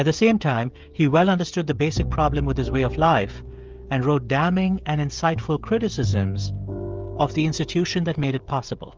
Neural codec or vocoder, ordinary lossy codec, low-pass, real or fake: codec, 16 kHz, 16 kbps, FreqCodec, smaller model; Opus, 24 kbps; 7.2 kHz; fake